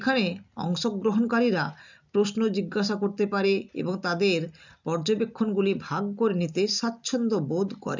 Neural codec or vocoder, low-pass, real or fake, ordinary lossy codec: none; 7.2 kHz; real; none